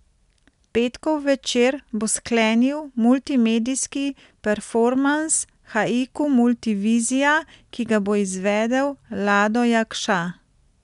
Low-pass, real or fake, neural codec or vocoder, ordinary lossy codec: 10.8 kHz; real; none; none